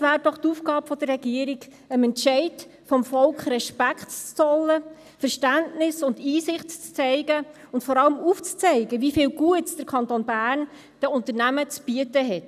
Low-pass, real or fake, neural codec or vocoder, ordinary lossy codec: 14.4 kHz; real; none; none